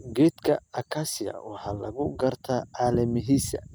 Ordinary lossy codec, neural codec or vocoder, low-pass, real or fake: none; none; none; real